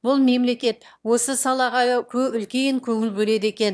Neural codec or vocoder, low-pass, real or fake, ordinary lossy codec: autoencoder, 22.05 kHz, a latent of 192 numbers a frame, VITS, trained on one speaker; none; fake; none